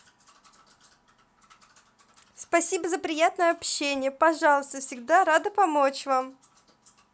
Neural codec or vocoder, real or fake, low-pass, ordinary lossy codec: none; real; none; none